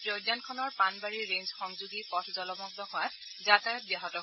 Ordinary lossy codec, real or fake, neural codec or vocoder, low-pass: MP3, 24 kbps; real; none; 7.2 kHz